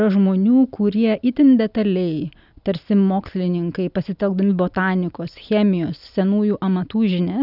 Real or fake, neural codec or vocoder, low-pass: real; none; 5.4 kHz